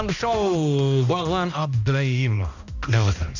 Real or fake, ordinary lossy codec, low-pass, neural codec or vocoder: fake; none; 7.2 kHz; codec, 16 kHz, 1 kbps, X-Codec, HuBERT features, trained on balanced general audio